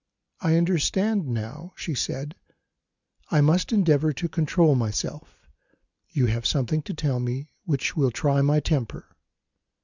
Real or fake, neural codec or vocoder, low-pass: real; none; 7.2 kHz